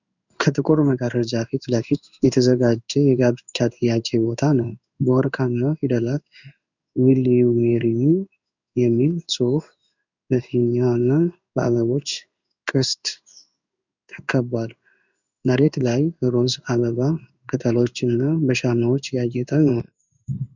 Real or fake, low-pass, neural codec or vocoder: fake; 7.2 kHz; codec, 16 kHz in and 24 kHz out, 1 kbps, XY-Tokenizer